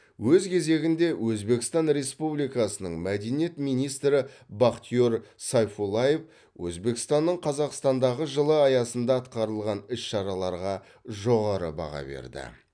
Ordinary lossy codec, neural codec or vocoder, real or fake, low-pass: none; none; real; 9.9 kHz